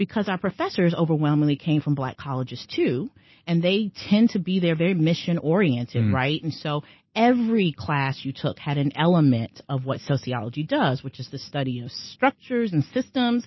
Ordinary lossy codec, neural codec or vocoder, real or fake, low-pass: MP3, 24 kbps; none; real; 7.2 kHz